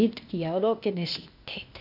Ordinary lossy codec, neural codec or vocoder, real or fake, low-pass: none; codec, 16 kHz, 0.8 kbps, ZipCodec; fake; 5.4 kHz